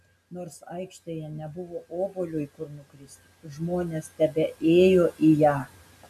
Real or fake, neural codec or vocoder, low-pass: real; none; 14.4 kHz